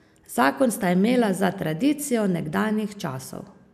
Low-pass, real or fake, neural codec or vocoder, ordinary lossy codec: 14.4 kHz; real; none; none